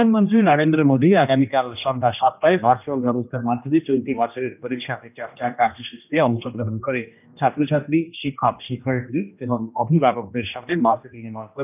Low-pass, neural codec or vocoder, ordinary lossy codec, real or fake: 3.6 kHz; codec, 16 kHz, 1 kbps, X-Codec, HuBERT features, trained on general audio; none; fake